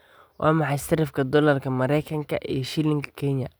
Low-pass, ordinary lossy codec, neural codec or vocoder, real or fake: none; none; none; real